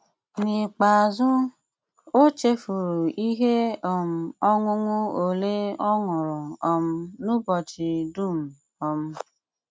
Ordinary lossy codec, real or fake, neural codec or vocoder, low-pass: none; real; none; none